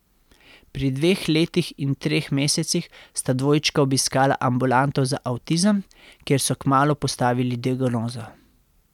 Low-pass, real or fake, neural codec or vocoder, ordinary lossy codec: 19.8 kHz; real; none; none